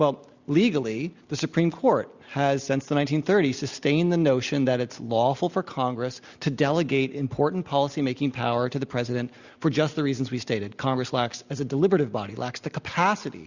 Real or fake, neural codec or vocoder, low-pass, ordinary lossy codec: real; none; 7.2 kHz; Opus, 64 kbps